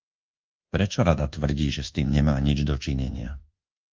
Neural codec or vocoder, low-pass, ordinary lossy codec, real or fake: codec, 24 kHz, 1.2 kbps, DualCodec; 7.2 kHz; Opus, 24 kbps; fake